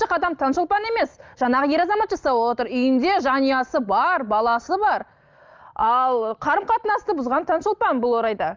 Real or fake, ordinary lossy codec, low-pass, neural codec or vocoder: real; Opus, 24 kbps; 7.2 kHz; none